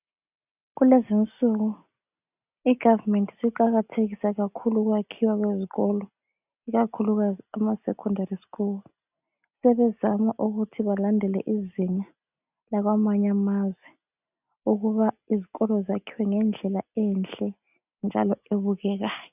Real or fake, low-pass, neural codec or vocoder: real; 3.6 kHz; none